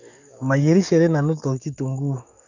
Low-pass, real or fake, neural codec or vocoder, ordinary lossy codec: 7.2 kHz; fake; codec, 44.1 kHz, 7.8 kbps, DAC; none